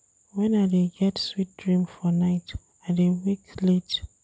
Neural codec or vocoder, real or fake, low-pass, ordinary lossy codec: none; real; none; none